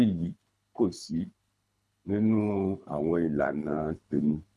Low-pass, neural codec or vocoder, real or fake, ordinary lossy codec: none; codec, 24 kHz, 3 kbps, HILCodec; fake; none